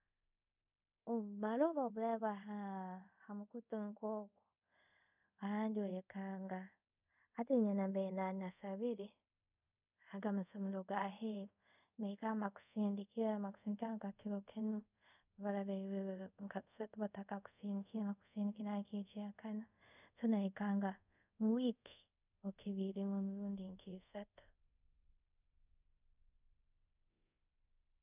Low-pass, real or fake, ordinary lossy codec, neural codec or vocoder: 3.6 kHz; fake; none; codec, 24 kHz, 0.5 kbps, DualCodec